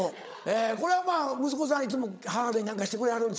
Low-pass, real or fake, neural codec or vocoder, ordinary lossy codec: none; fake; codec, 16 kHz, 8 kbps, FreqCodec, larger model; none